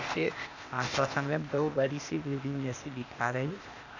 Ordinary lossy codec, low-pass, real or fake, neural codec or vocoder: none; 7.2 kHz; fake; codec, 16 kHz, 0.8 kbps, ZipCodec